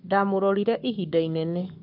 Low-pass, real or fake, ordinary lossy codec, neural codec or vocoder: 5.4 kHz; fake; AAC, 48 kbps; codec, 44.1 kHz, 7.8 kbps, Pupu-Codec